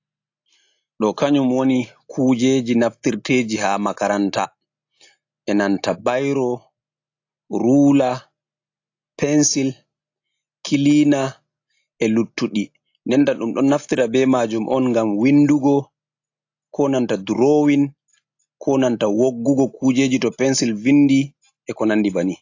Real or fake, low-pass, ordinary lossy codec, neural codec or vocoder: real; 7.2 kHz; AAC, 48 kbps; none